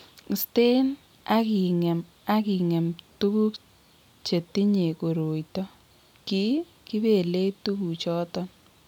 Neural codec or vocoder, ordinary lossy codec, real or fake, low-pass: none; none; real; 19.8 kHz